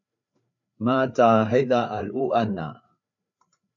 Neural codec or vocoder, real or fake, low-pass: codec, 16 kHz, 4 kbps, FreqCodec, larger model; fake; 7.2 kHz